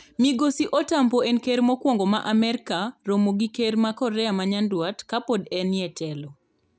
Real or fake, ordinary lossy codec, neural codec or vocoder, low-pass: real; none; none; none